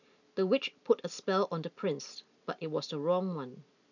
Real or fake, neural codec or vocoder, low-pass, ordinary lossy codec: real; none; 7.2 kHz; none